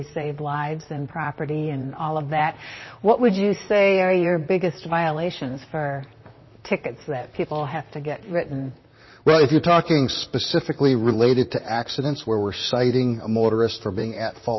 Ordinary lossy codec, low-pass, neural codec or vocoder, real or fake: MP3, 24 kbps; 7.2 kHz; vocoder, 44.1 kHz, 128 mel bands, Pupu-Vocoder; fake